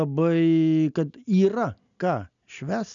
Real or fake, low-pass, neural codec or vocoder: real; 7.2 kHz; none